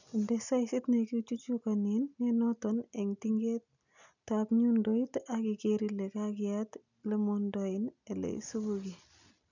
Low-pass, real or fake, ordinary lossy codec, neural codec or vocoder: 7.2 kHz; real; none; none